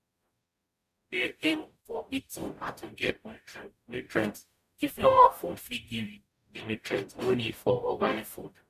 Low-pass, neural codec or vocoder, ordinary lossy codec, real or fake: 14.4 kHz; codec, 44.1 kHz, 0.9 kbps, DAC; MP3, 96 kbps; fake